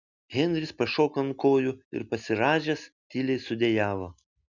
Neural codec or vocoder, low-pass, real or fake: none; 7.2 kHz; real